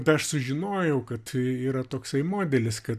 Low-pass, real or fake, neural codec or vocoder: 14.4 kHz; real; none